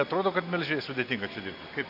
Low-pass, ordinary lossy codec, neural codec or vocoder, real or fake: 5.4 kHz; AAC, 48 kbps; none; real